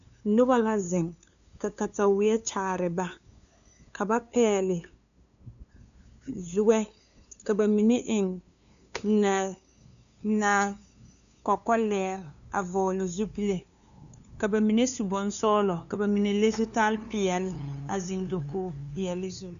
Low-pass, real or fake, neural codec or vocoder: 7.2 kHz; fake; codec, 16 kHz, 2 kbps, FunCodec, trained on LibriTTS, 25 frames a second